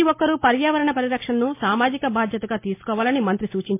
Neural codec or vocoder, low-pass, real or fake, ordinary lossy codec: none; 3.6 kHz; real; MP3, 24 kbps